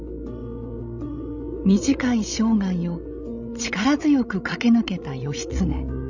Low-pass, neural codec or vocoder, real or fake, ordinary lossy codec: 7.2 kHz; codec, 16 kHz, 16 kbps, FreqCodec, larger model; fake; none